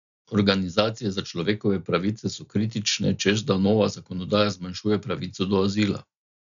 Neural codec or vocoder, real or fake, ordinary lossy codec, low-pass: none; real; none; 7.2 kHz